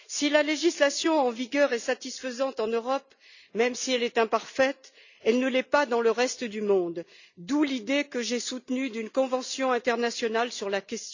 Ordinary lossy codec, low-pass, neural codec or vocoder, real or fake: none; 7.2 kHz; none; real